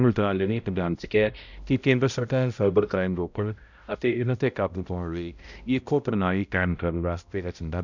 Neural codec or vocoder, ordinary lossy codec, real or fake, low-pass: codec, 16 kHz, 0.5 kbps, X-Codec, HuBERT features, trained on balanced general audio; none; fake; 7.2 kHz